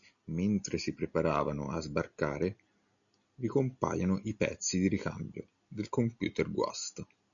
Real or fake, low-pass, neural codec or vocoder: real; 7.2 kHz; none